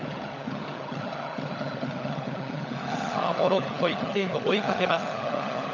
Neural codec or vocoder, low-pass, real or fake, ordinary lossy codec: codec, 16 kHz, 16 kbps, FunCodec, trained on LibriTTS, 50 frames a second; 7.2 kHz; fake; none